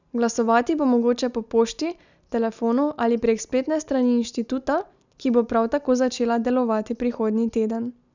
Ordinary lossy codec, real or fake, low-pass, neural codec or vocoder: none; real; 7.2 kHz; none